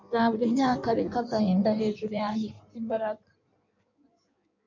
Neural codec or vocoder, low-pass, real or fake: codec, 16 kHz in and 24 kHz out, 1.1 kbps, FireRedTTS-2 codec; 7.2 kHz; fake